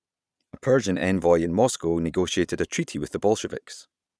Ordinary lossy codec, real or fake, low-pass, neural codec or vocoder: none; fake; none; vocoder, 22.05 kHz, 80 mel bands, Vocos